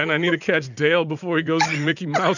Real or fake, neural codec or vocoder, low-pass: fake; vocoder, 44.1 kHz, 80 mel bands, Vocos; 7.2 kHz